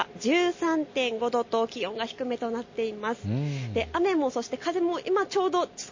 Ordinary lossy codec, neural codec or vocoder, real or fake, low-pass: MP3, 32 kbps; none; real; 7.2 kHz